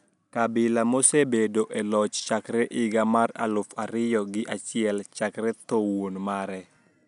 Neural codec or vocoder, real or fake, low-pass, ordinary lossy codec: none; real; 10.8 kHz; none